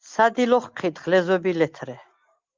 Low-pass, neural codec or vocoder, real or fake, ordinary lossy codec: 7.2 kHz; none; real; Opus, 32 kbps